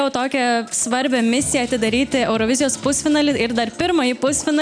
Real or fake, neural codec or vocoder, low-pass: real; none; 9.9 kHz